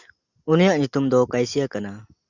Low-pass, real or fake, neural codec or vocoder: 7.2 kHz; real; none